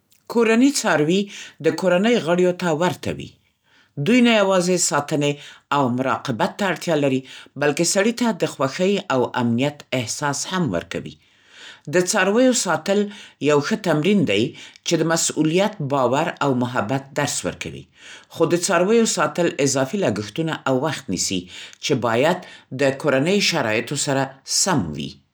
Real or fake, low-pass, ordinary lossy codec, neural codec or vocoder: real; none; none; none